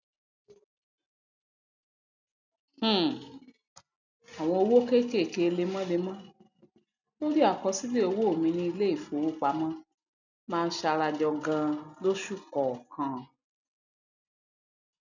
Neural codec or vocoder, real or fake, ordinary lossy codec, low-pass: none; real; none; 7.2 kHz